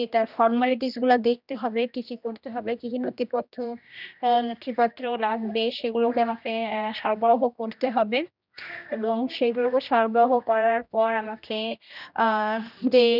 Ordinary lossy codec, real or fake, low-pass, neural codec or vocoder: none; fake; 5.4 kHz; codec, 16 kHz, 1 kbps, X-Codec, HuBERT features, trained on general audio